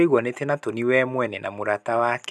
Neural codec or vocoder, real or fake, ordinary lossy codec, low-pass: none; real; none; none